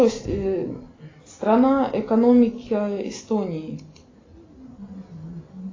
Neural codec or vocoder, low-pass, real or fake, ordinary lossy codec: none; 7.2 kHz; real; AAC, 32 kbps